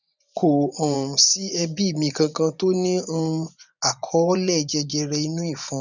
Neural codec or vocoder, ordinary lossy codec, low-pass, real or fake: vocoder, 24 kHz, 100 mel bands, Vocos; none; 7.2 kHz; fake